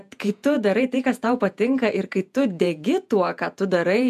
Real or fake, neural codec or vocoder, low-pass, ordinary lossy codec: fake; vocoder, 48 kHz, 128 mel bands, Vocos; 14.4 kHz; MP3, 96 kbps